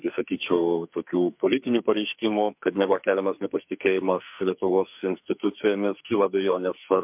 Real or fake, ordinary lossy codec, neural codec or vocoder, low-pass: fake; MP3, 32 kbps; codec, 44.1 kHz, 2.6 kbps, SNAC; 3.6 kHz